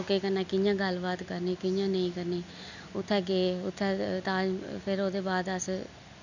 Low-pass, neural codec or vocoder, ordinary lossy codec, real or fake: 7.2 kHz; none; none; real